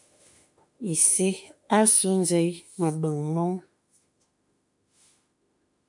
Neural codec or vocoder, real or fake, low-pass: autoencoder, 48 kHz, 32 numbers a frame, DAC-VAE, trained on Japanese speech; fake; 10.8 kHz